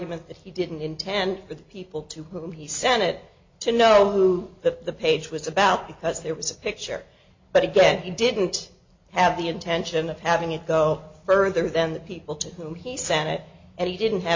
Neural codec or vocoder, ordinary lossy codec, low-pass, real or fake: none; MP3, 48 kbps; 7.2 kHz; real